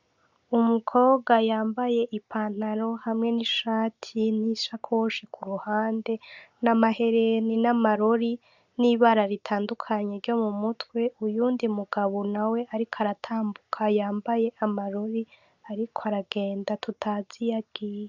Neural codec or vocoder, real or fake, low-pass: none; real; 7.2 kHz